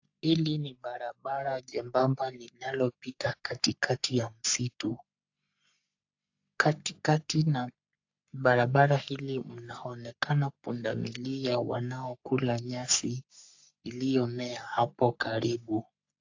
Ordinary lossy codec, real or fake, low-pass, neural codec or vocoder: AAC, 48 kbps; fake; 7.2 kHz; codec, 44.1 kHz, 3.4 kbps, Pupu-Codec